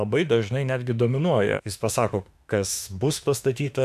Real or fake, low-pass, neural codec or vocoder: fake; 14.4 kHz; autoencoder, 48 kHz, 32 numbers a frame, DAC-VAE, trained on Japanese speech